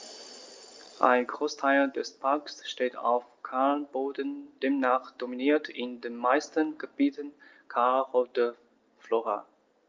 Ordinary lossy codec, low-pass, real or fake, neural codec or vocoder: Opus, 24 kbps; 7.2 kHz; real; none